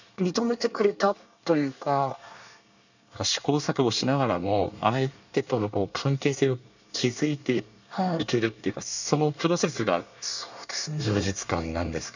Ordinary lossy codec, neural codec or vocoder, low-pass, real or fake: none; codec, 24 kHz, 1 kbps, SNAC; 7.2 kHz; fake